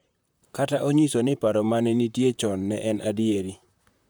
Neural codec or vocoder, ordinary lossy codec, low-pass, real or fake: vocoder, 44.1 kHz, 128 mel bands, Pupu-Vocoder; none; none; fake